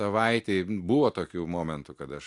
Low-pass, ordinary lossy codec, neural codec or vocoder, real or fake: 10.8 kHz; AAC, 64 kbps; none; real